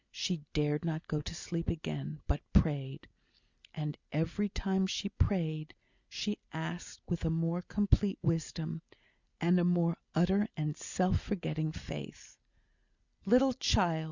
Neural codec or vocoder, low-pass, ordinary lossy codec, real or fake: none; 7.2 kHz; Opus, 64 kbps; real